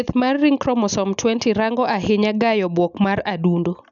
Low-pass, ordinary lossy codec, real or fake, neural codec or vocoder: 7.2 kHz; none; real; none